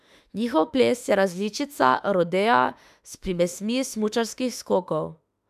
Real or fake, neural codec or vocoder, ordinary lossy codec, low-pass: fake; autoencoder, 48 kHz, 32 numbers a frame, DAC-VAE, trained on Japanese speech; none; 14.4 kHz